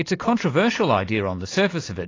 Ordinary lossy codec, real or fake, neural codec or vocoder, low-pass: AAC, 32 kbps; real; none; 7.2 kHz